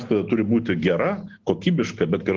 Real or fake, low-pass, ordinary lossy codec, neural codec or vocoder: real; 7.2 kHz; Opus, 16 kbps; none